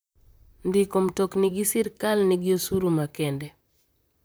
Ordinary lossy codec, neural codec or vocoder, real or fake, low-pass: none; vocoder, 44.1 kHz, 128 mel bands, Pupu-Vocoder; fake; none